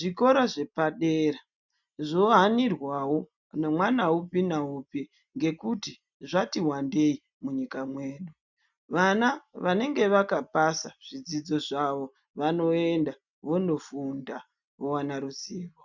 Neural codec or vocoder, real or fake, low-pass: none; real; 7.2 kHz